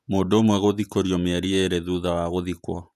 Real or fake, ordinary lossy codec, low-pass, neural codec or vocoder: fake; none; 14.4 kHz; vocoder, 48 kHz, 128 mel bands, Vocos